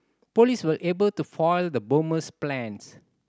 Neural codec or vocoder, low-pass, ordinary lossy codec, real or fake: none; none; none; real